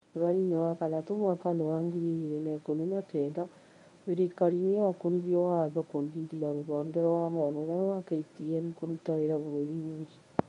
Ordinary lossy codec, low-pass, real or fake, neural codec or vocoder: MP3, 48 kbps; 10.8 kHz; fake; codec, 24 kHz, 0.9 kbps, WavTokenizer, medium speech release version 1